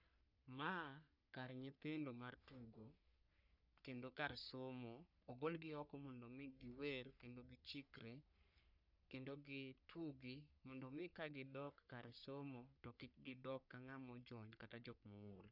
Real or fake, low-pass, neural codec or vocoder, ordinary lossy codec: fake; 5.4 kHz; codec, 44.1 kHz, 3.4 kbps, Pupu-Codec; none